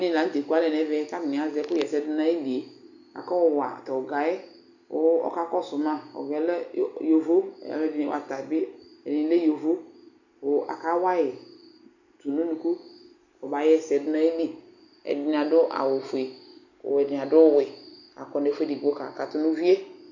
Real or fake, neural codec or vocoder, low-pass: real; none; 7.2 kHz